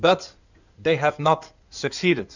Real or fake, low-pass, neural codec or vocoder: fake; 7.2 kHz; codec, 16 kHz in and 24 kHz out, 2.2 kbps, FireRedTTS-2 codec